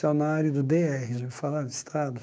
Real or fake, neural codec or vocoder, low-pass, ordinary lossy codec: fake; codec, 16 kHz, 6 kbps, DAC; none; none